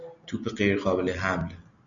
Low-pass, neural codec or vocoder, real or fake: 7.2 kHz; none; real